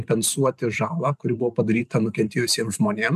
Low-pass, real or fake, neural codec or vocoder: 14.4 kHz; fake; vocoder, 44.1 kHz, 128 mel bands every 512 samples, BigVGAN v2